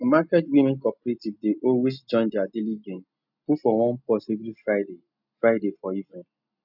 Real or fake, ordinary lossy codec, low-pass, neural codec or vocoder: real; none; 5.4 kHz; none